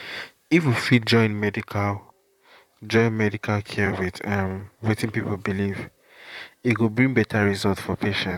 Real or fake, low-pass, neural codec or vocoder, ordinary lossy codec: fake; 19.8 kHz; vocoder, 44.1 kHz, 128 mel bands, Pupu-Vocoder; none